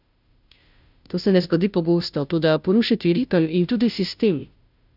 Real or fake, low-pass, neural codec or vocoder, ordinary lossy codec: fake; 5.4 kHz; codec, 16 kHz, 0.5 kbps, FunCodec, trained on Chinese and English, 25 frames a second; none